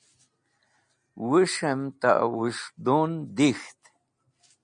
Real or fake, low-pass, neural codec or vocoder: real; 9.9 kHz; none